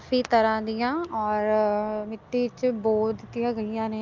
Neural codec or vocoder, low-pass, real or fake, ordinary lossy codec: none; 7.2 kHz; real; Opus, 24 kbps